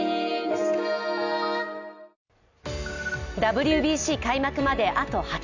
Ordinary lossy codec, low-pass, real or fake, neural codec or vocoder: none; 7.2 kHz; real; none